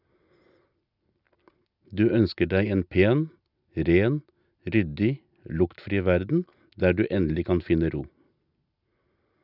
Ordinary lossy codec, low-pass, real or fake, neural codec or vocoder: none; 5.4 kHz; real; none